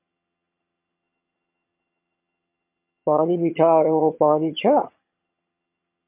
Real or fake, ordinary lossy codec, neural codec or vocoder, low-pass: fake; MP3, 32 kbps; vocoder, 22.05 kHz, 80 mel bands, HiFi-GAN; 3.6 kHz